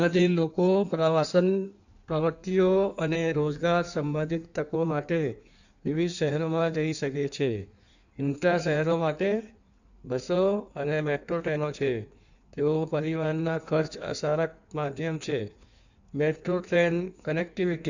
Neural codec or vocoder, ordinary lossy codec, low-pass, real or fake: codec, 16 kHz in and 24 kHz out, 1.1 kbps, FireRedTTS-2 codec; none; 7.2 kHz; fake